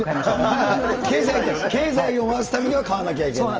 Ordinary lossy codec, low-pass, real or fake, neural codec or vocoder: Opus, 24 kbps; 7.2 kHz; real; none